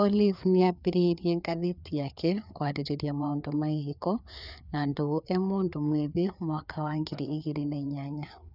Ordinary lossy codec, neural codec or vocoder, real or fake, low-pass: none; codec, 16 kHz, 4 kbps, FreqCodec, larger model; fake; 5.4 kHz